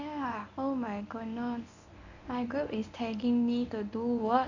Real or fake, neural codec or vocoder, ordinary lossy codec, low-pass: fake; codec, 24 kHz, 0.9 kbps, WavTokenizer, medium speech release version 1; none; 7.2 kHz